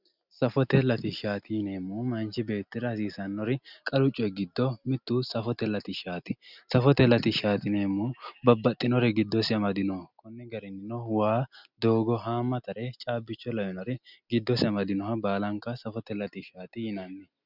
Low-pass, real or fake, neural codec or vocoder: 5.4 kHz; real; none